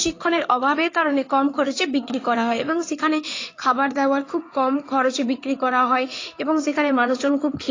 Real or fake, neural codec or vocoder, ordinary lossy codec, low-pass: fake; codec, 16 kHz in and 24 kHz out, 2.2 kbps, FireRedTTS-2 codec; AAC, 32 kbps; 7.2 kHz